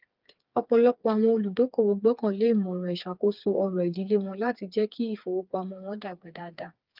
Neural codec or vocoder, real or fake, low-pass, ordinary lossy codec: codec, 16 kHz, 4 kbps, FreqCodec, smaller model; fake; 5.4 kHz; Opus, 24 kbps